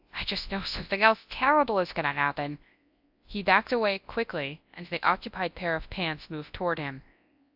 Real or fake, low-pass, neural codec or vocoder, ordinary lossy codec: fake; 5.4 kHz; codec, 24 kHz, 0.9 kbps, WavTokenizer, large speech release; AAC, 48 kbps